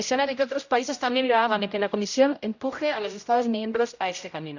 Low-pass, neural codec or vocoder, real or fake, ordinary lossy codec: 7.2 kHz; codec, 16 kHz, 0.5 kbps, X-Codec, HuBERT features, trained on general audio; fake; none